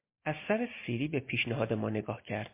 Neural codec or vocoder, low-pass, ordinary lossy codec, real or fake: none; 3.6 kHz; MP3, 24 kbps; real